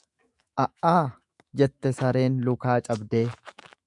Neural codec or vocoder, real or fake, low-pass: autoencoder, 48 kHz, 128 numbers a frame, DAC-VAE, trained on Japanese speech; fake; 10.8 kHz